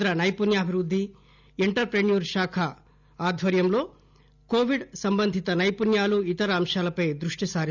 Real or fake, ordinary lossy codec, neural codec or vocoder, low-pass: real; none; none; 7.2 kHz